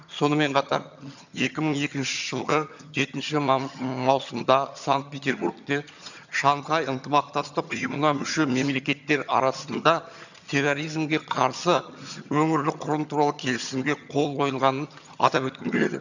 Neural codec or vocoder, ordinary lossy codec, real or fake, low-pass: vocoder, 22.05 kHz, 80 mel bands, HiFi-GAN; none; fake; 7.2 kHz